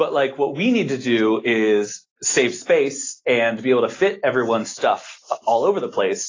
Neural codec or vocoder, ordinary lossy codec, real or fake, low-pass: none; AAC, 32 kbps; real; 7.2 kHz